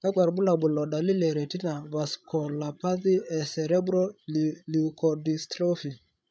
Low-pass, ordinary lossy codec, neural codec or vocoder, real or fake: none; none; codec, 16 kHz, 16 kbps, FreqCodec, larger model; fake